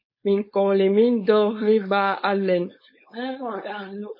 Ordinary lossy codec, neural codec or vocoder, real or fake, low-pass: MP3, 24 kbps; codec, 16 kHz, 4.8 kbps, FACodec; fake; 5.4 kHz